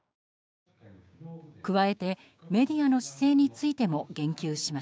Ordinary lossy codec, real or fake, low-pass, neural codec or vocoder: none; fake; none; codec, 16 kHz, 6 kbps, DAC